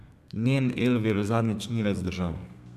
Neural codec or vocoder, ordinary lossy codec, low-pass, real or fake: codec, 32 kHz, 1.9 kbps, SNAC; none; 14.4 kHz; fake